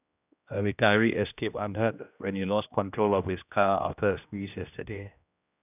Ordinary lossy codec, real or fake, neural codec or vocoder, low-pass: none; fake; codec, 16 kHz, 1 kbps, X-Codec, HuBERT features, trained on general audio; 3.6 kHz